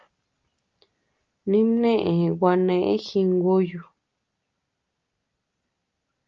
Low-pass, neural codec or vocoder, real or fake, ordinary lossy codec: 7.2 kHz; none; real; Opus, 24 kbps